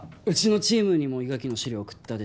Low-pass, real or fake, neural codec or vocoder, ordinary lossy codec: none; real; none; none